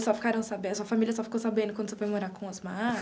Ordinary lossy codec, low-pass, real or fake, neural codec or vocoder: none; none; real; none